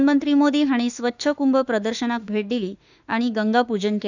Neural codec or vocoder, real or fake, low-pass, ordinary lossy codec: autoencoder, 48 kHz, 32 numbers a frame, DAC-VAE, trained on Japanese speech; fake; 7.2 kHz; none